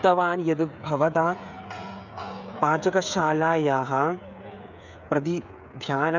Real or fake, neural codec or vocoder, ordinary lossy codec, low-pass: fake; codec, 16 kHz, 8 kbps, FreqCodec, smaller model; none; 7.2 kHz